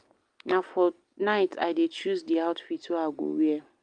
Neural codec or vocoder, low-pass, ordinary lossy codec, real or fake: none; 9.9 kHz; Opus, 32 kbps; real